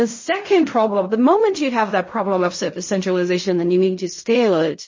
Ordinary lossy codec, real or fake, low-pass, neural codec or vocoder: MP3, 32 kbps; fake; 7.2 kHz; codec, 16 kHz in and 24 kHz out, 0.4 kbps, LongCat-Audio-Codec, fine tuned four codebook decoder